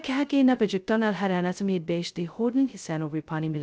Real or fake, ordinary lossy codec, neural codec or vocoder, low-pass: fake; none; codec, 16 kHz, 0.2 kbps, FocalCodec; none